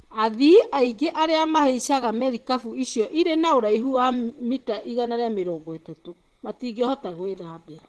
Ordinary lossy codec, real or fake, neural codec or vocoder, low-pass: Opus, 16 kbps; fake; vocoder, 44.1 kHz, 128 mel bands, Pupu-Vocoder; 10.8 kHz